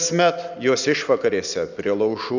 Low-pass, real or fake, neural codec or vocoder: 7.2 kHz; real; none